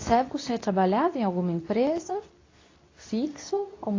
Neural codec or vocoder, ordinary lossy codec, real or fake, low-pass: codec, 24 kHz, 0.9 kbps, WavTokenizer, medium speech release version 2; AAC, 32 kbps; fake; 7.2 kHz